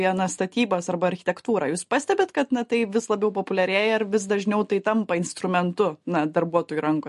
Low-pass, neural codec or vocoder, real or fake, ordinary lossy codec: 14.4 kHz; none; real; MP3, 48 kbps